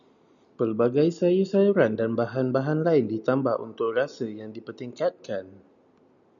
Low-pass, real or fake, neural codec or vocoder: 7.2 kHz; real; none